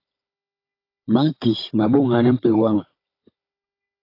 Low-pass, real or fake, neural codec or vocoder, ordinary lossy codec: 5.4 kHz; fake; codec, 16 kHz, 16 kbps, FunCodec, trained on Chinese and English, 50 frames a second; AAC, 32 kbps